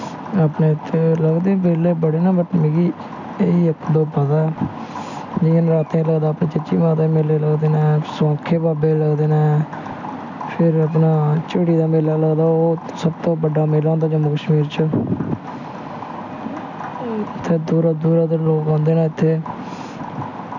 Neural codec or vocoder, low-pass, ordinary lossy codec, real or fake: none; 7.2 kHz; none; real